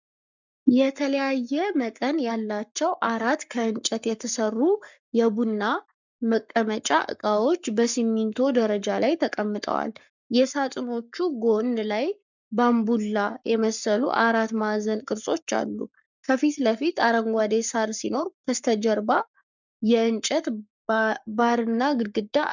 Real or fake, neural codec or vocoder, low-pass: fake; codec, 44.1 kHz, 7.8 kbps, Pupu-Codec; 7.2 kHz